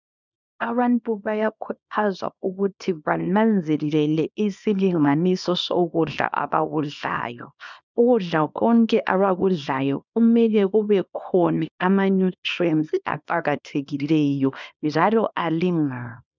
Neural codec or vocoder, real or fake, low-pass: codec, 24 kHz, 0.9 kbps, WavTokenizer, small release; fake; 7.2 kHz